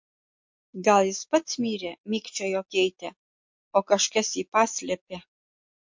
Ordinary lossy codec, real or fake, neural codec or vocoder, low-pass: MP3, 48 kbps; real; none; 7.2 kHz